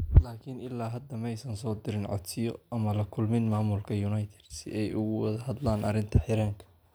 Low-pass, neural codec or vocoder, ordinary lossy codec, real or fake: none; none; none; real